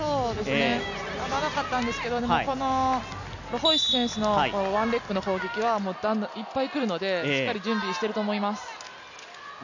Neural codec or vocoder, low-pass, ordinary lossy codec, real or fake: none; 7.2 kHz; none; real